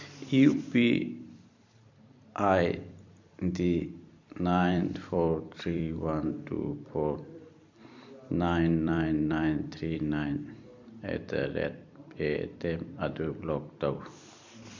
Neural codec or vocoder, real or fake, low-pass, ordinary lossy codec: vocoder, 44.1 kHz, 128 mel bands every 256 samples, BigVGAN v2; fake; 7.2 kHz; MP3, 64 kbps